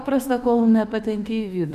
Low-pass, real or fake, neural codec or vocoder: 14.4 kHz; fake; autoencoder, 48 kHz, 32 numbers a frame, DAC-VAE, trained on Japanese speech